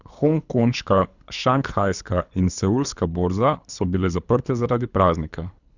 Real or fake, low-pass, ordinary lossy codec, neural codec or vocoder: fake; 7.2 kHz; none; codec, 24 kHz, 3 kbps, HILCodec